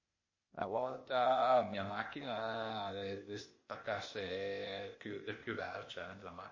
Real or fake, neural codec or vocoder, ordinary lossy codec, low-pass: fake; codec, 16 kHz, 0.8 kbps, ZipCodec; MP3, 32 kbps; 7.2 kHz